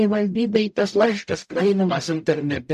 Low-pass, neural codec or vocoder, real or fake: 14.4 kHz; codec, 44.1 kHz, 0.9 kbps, DAC; fake